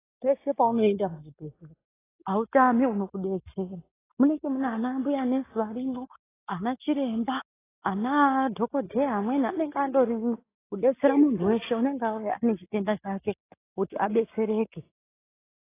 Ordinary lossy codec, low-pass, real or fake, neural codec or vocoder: AAC, 16 kbps; 3.6 kHz; real; none